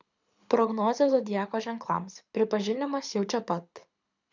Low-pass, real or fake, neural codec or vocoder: 7.2 kHz; fake; codec, 24 kHz, 6 kbps, HILCodec